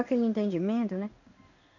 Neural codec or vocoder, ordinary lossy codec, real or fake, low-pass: codec, 16 kHz in and 24 kHz out, 1 kbps, XY-Tokenizer; none; fake; 7.2 kHz